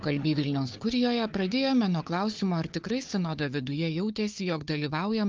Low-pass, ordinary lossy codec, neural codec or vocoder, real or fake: 7.2 kHz; Opus, 24 kbps; codec, 16 kHz, 4 kbps, FunCodec, trained on Chinese and English, 50 frames a second; fake